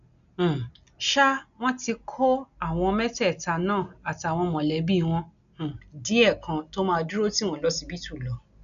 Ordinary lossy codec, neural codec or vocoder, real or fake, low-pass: none; none; real; 7.2 kHz